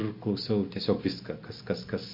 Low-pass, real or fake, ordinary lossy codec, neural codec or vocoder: 5.4 kHz; real; MP3, 32 kbps; none